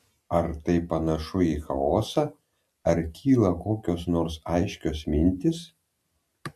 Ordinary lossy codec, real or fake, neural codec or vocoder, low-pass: AAC, 96 kbps; real; none; 14.4 kHz